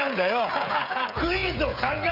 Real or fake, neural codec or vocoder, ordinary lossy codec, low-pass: fake; codec, 16 kHz, 16 kbps, FreqCodec, smaller model; none; 5.4 kHz